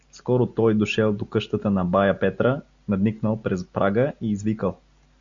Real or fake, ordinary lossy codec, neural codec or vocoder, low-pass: real; Opus, 64 kbps; none; 7.2 kHz